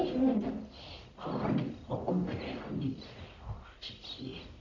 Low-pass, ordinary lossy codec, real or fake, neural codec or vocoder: 7.2 kHz; none; fake; codec, 44.1 kHz, 1.7 kbps, Pupu-Codec